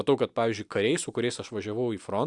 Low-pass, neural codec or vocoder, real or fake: 10.8 kHz; none; real